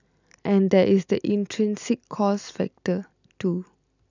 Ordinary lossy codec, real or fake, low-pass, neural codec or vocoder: none; real; 7.2 kHz; none